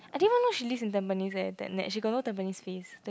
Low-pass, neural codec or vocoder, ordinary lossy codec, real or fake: none; none; none; real